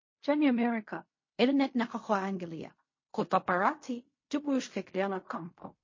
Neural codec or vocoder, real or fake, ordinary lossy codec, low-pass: codec, 16 kHz in and 24 kHz out, 0.4 kbps, LongCat-Audio-Codec, fine tuned four codebook decoder; fake; MP3, 32 kbps; 7.2 kHz